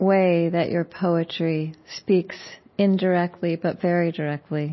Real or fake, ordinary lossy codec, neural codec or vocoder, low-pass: real; MP3, 24 kbps; none; 7.2 kHz